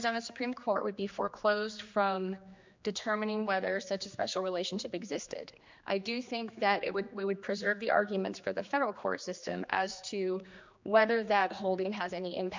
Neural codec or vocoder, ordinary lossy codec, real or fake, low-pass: codec, 16 kHz, 2 kbps, X-Codec, HuBERT features, trained on general audio; MP3, 64 kbps; fake; 7.2 kHz